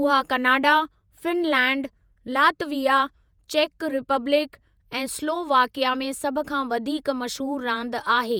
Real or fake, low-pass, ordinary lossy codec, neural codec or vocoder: fake; none; none; vocoder, 48 kHz, 128 mel bands, Vocos